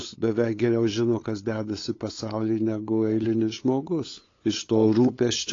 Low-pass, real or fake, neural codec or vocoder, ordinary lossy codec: 7.2 kHz; fake; codec, 16 kHz, 8 kbps, FunCodec, trained on LibriTTS, 25 frames a second; AAC, 32 kbps